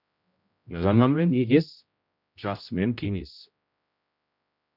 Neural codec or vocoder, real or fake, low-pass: codec, 16 kHz, 0.5 kbps, X-Codec, HuBERT features, trained on general audio; fake; 5.4 kHz